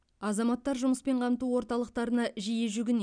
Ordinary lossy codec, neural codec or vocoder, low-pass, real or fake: none; none; 9.9 kHz; real